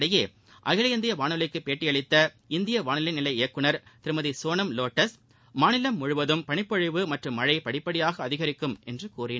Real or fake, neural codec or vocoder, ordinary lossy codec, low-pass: real; none; none; none